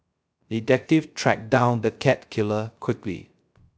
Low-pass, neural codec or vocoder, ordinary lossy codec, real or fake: none; codec, 16 kHz, 0.3 kbps, FocalCodec; none; fake